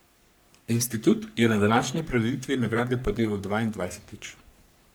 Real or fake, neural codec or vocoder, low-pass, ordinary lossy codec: fake; codec, 44.1 kHz, 3.4 kbps, Pupu-Codec; none; none